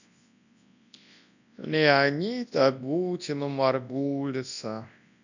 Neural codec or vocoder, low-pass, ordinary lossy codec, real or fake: codec, 24 kHz, 0.9 kbps, WavTokenizer, large speech release; 7.2 kHz; none; fake